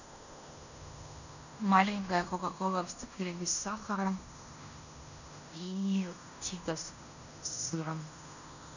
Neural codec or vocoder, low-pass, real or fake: codec, 16 kHz in and 24 kHz out, 0.9 kbps, LongCat-Audio-Codec, fine tuned four codebook decoder; 7.2 kHz; fake